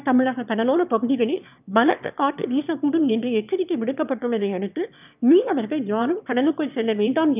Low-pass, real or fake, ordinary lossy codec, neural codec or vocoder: 3.6 kHz; fake; none; autoencoder, 22.05 kHz, a latent of 192 numbers a frame, VITS, trained on one speaker